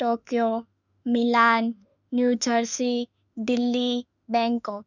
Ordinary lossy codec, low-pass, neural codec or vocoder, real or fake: none; 7.2 kHz; autoencoder, 48 kHz, 32 numbers a frame, DAC-VAE, trained on Japanese speech; fake